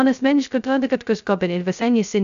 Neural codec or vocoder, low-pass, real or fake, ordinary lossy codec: codec, 16 kHz, 0.2 kbps, FocalCodec; 7.2 kHz; fake; AAC, 96 kbps